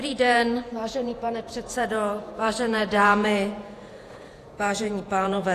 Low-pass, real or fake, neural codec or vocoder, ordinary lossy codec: 14.4 kHz; fake; vocoder, 48 kHz, 128 mel bands, Vocos; AAC, 64 kbps